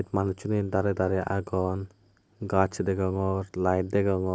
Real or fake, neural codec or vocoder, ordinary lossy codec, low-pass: real; none; none; none